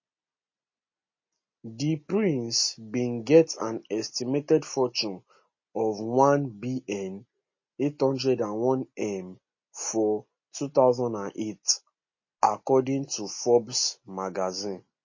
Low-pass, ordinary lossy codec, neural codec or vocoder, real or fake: 7.2 kHz; MP3, 32 kbps; none; real